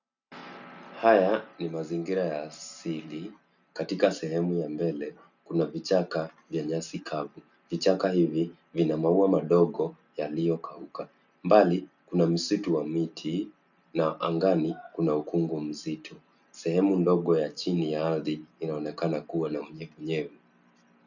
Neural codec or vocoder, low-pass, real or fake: none; 7.2 kHz; real